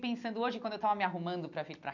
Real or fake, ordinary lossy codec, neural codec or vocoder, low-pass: real; none; none; 7.2 kHz